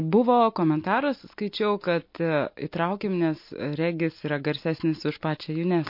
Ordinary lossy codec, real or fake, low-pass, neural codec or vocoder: MP3, 32 kbps; real; 5.4 kHz; none